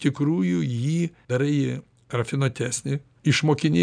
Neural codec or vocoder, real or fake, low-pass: none; real; 9.9 kHz